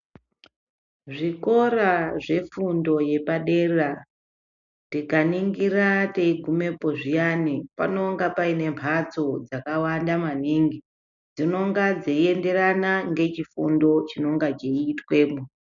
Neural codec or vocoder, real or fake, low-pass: none; real; 7.2 kHz